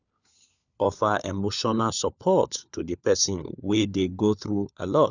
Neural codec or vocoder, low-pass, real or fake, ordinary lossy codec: codec, 16 kHz, 4 kbps, FunCodec, trained on LibriTTS, 50 frames a second; 7.2 kHz; fake; none